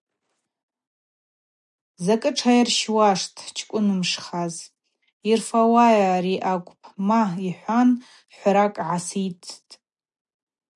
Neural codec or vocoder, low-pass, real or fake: none; 10.8 kHz; real